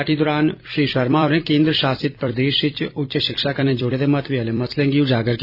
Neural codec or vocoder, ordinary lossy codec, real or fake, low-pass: vocoder, 44.1 kHz, 128 mel bands every 256 samples, BigVGAN v2; none; fake; 5.4 kHz